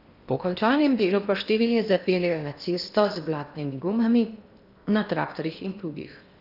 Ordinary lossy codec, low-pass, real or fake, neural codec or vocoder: none; 5.4 kHz; fake; codec, 16 kHz in and 24 kHz out, 0.8 kbps, FocalCodec, streaming, 65536 codes